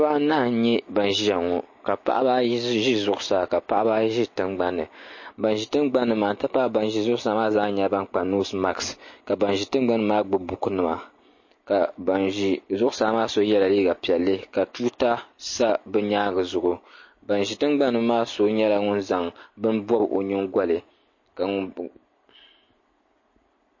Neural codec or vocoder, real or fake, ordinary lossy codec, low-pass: none; real; MP3, 32 kbps; 7.2 kHz